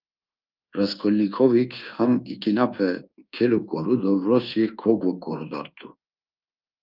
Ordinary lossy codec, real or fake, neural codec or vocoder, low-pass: Opus, 24 kbps; fake; codec, 24 kHz, 1.2 kbps, DualCodec; 5.4 kHz